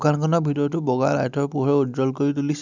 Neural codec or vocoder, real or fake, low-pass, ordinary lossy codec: none; real; 7.2 kHz; none